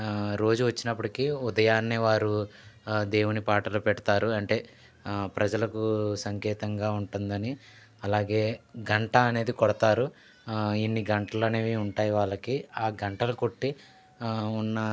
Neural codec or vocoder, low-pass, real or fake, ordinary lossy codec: none; none; real; none